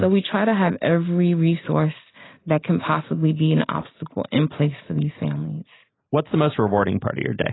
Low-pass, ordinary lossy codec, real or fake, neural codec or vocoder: 7.2 kHz; AAC, 16 kbps; real; none